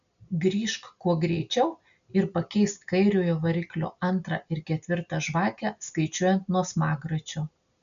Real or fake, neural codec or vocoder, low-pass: real; none; 7.2 kHz